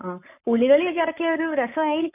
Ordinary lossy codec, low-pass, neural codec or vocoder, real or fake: none; 3.6 kHz; codec, 44.1 kHz, 7.8 kbps, Pupu-Codec; fake